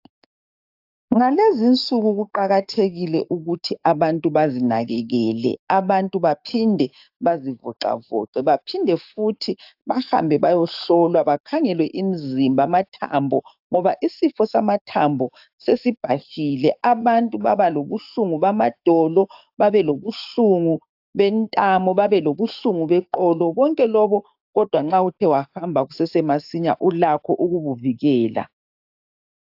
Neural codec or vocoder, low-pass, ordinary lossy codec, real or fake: codec, 44.1 kHz, 7.8 kbps, DAC; 5.4 kHz; AAC, 48 kbps; fake